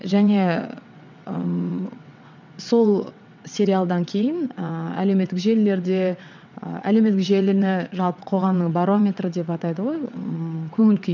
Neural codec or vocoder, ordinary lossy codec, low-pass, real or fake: vocoder, 22.05 kHz, 80 mel bands, WaveNeXt; none; 7.2 kHz; fake